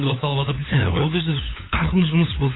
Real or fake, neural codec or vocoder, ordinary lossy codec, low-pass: fake; codec, 16 kHz, 4 kbps, FunCodec, trained on LibriTTS, 50 frames a second; AAC, 16 kbps; 7.2 kHz